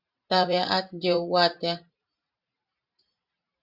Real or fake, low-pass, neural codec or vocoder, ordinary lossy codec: fake; 5.4 kHz; vocoder, 44.1 kHz, 128 mel bands every 512 samples, BigVGAN v2; Opus, 64 kbps